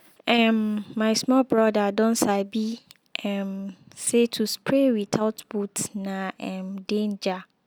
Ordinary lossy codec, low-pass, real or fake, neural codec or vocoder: none; none; real; none